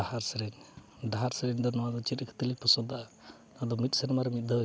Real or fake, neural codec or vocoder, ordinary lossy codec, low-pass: real; none; none; none